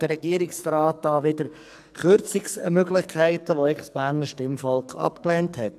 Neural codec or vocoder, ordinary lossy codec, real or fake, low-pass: codec, 44.1 kHz, 2.6 kbps, SNAC; AAC, 96 kbps; fake; 14.4 kHz